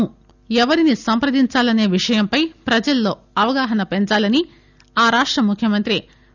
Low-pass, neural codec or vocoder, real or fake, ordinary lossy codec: 7.2 kHz; none; real; none